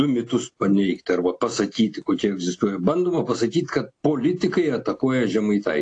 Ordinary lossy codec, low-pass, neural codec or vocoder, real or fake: AAC, 48 kbps; 9.9 kHz; none; real